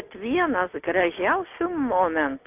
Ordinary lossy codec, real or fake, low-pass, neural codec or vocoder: AAC, 32 kbps; real; 3.6 kHz; none